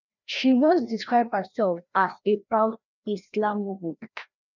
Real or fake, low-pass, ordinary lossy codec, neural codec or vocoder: fake; 7.2 kHz; none; codec, 16 kHz, 1 kbps, FreqCodec, larger model